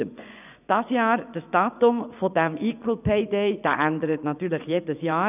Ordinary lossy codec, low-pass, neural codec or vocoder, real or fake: none; 3.6 kHz; vocoder, 24 kHz, 100 mel bands, Vocos; fake